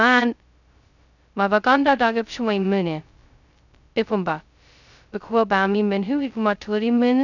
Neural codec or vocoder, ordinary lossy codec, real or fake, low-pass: codec, 16 kHz, 0.2 kbps, FocalCodec; none; fake; 7.2 kHz